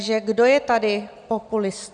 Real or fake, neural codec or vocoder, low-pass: real; none; 9.9 kHz